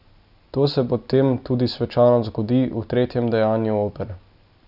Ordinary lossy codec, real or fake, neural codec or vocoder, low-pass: none; real; none; 5.4 kHz